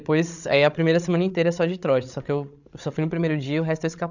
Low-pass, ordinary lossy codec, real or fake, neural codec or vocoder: 7.2 kHz; none; fake; codec, 16 kHz, 16 kbps, FreqCodec, larger model